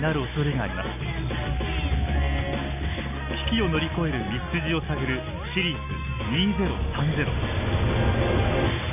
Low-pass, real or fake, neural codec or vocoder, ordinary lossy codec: 3.6 kHz; real; none; none